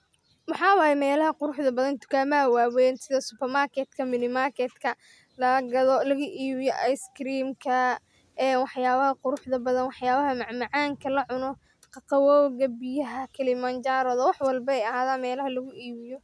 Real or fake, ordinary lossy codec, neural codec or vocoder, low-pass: real; none; none; none